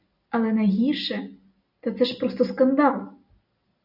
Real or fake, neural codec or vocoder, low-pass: real; none; 5.4 kHz